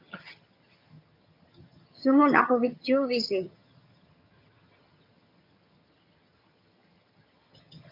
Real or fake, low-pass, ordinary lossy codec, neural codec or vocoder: fake; 5.4 kHz; MP3, 48 kbps; vocoder, 22.05 kHz, 80 mel bands, HiFi-GAN